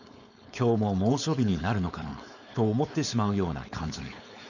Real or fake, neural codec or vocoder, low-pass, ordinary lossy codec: fake; codec, 16 kHz, 4.8 kbps, FACodec; 7.2 kHz; none